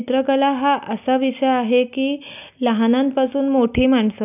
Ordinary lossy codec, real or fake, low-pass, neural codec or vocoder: none; real; 3.6 kHz; none